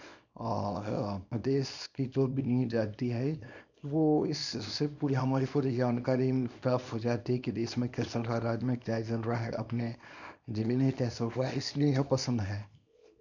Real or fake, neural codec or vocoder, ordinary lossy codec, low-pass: fake; codec, 24 kHz, 0.9 kbps, WavTokenizer, small release; none; 7.2 kHz